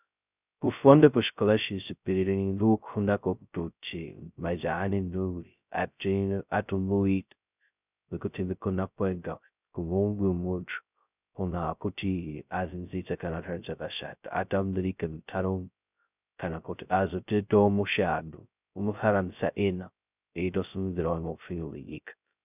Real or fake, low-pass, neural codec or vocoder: fake; 3.6 kHz; codec, 16 kHz, 0.2 kbps, FocalCodec